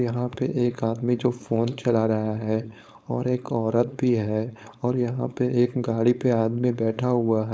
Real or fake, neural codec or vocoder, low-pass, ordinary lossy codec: fake; codec, 16 kHz, 4.8 kbps, FACodec; none; none